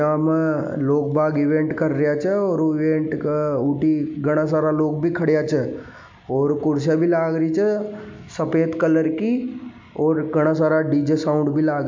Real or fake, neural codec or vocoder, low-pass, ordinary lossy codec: real; none; 7.2 kHz; MP3, 64 kbps